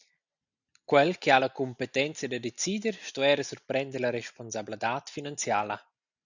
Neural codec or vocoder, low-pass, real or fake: none; 7.2 kHz; real